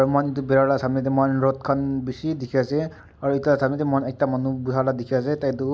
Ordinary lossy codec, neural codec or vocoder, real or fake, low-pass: none; none; real; none